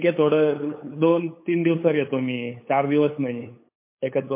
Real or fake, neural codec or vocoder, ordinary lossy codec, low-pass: fake; codec, 16 kHz, 8 kbps, FunCodec, trained on LibriTTS, 25 frames a second; MP3, 24 kbps; 3.6 kHz